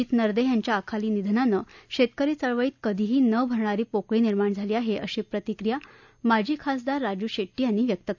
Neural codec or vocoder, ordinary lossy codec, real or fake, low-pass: none; none; real; 7.2 kHz